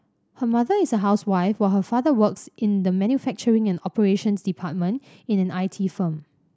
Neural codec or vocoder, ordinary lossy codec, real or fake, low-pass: none; none; real; none